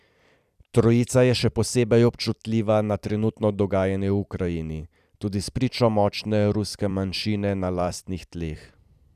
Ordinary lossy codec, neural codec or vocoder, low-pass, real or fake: none; vocoder, 44.1 kHz, 128 mel bands every 256 samples, BigVGAN v2; 14.4 kHz; fake